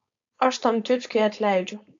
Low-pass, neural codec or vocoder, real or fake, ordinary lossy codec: 7.2 kHz; codec, 16 kHz, 4.8 kbps, FACodec; fake; AAC, 48 kbps